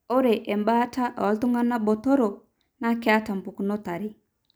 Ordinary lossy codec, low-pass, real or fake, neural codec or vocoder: none; none; real; none